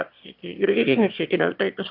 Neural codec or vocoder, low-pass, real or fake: autoencoder, 22.05 kHz, a latent of 192 numbers a frame, VITS, trained on one speaker; 5.4 kHz; fake